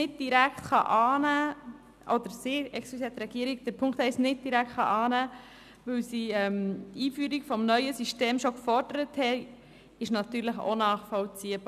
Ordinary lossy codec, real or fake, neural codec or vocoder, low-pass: none; real; none; 14.4 kHz